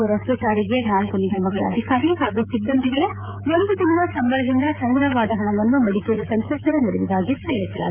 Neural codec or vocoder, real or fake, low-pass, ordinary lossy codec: codec, 16 kHz, 16 kbps, FreqCodec, smaller model; fake; 3.6 kHz; none